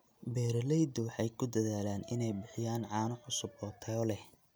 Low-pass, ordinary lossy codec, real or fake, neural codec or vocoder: none; none; real; none